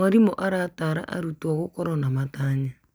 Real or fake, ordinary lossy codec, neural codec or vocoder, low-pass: real; none; none; none